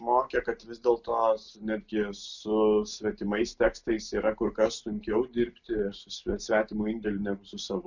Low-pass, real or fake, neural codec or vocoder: 7.2 kHz; real; none